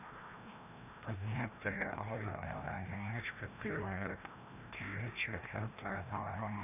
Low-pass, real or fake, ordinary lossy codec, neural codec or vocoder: 3.6 kHz; fake; none; codec, 16 kHz, 1 kbps, FreqCodec, larger model